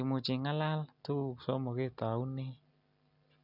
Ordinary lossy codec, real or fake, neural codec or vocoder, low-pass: none; real; none; 5.4 kHz